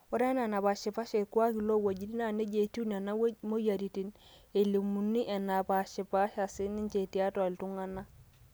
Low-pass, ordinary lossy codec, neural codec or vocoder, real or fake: none; none; none; real